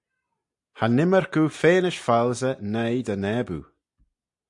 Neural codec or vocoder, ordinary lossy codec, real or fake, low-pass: none; AAC, 64 kbps; real; 10.8 kHz